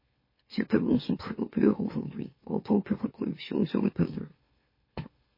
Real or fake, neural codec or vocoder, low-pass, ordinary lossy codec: fake; autoencoder, 44.1 kHz, a latent of 192 numbers a frame, MeloTTS; 5.4 kHz; MP3, 24 kbps